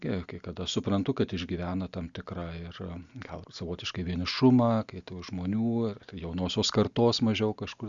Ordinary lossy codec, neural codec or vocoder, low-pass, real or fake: Opus, 64 kbps; none; 7.2 kHz; real